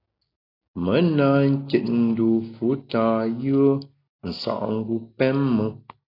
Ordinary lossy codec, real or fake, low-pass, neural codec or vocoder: AAC, 32 kbps; real; 5.4 kHz; none